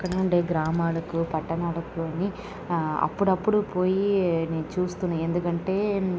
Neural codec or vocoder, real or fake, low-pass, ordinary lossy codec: none; real; none; none